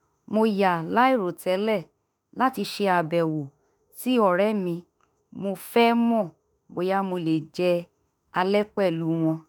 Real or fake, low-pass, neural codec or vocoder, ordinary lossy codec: fake; none; autoencoder, 48 kHz, 32 numbers a frame, DAC-VAE, trained on Japanese speech; none